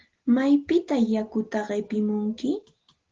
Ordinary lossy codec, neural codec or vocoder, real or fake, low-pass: Opus, 16 kbps; none; real; 7.2 kHz